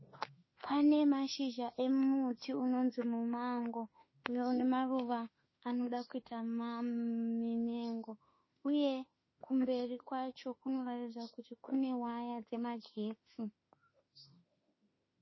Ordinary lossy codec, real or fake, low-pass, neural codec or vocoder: MP3, 24 kbps; fake; 7.2 kHz; autoencoder, 48 kHz, 32 numbers a frame, DAC-VAE, trained on Japanese speech